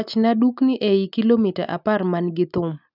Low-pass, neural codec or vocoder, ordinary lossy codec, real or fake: 5.4 kHz; none; none; real